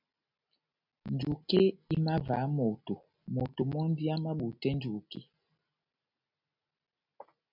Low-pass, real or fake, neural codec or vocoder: 5.4 kHz; real; none